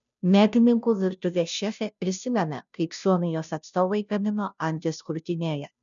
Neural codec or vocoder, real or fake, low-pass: codec, 16 kHz, 0.5 kbps, FunCodec, trained on Chinese and English, 25 frames a second; fake; 7.2 kHz